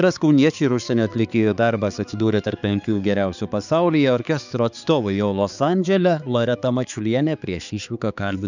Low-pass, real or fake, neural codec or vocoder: 7.2 kHz; fake; codec, 16 kHz, 4 kbps, X-Codec, HuBERT features, trained on balanced general audio